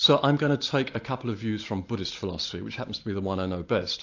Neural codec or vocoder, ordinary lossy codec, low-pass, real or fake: none; AAC, 48 kbps; 7.2 kHz; real